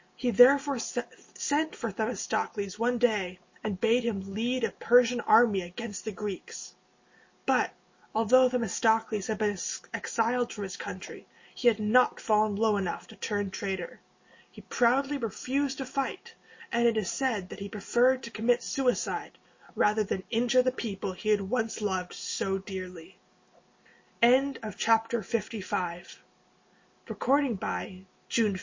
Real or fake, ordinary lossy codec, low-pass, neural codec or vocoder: real; MP3, 32 kbps; 7.2 kHz; none